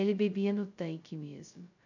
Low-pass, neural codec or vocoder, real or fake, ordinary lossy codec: 7.2 kHz; codec, 16 kHz, 0.2 kbps, FocalCodec; fake; none